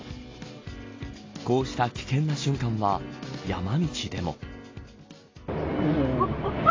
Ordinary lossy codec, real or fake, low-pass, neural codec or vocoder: AAC, 32 kbps; real; 7.2 kHz; none